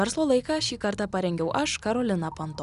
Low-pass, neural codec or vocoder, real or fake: 10.8 kHz; none; real